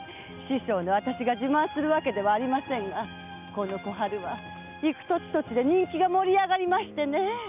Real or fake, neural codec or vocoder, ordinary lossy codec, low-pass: real; none; none; 3.6 kHz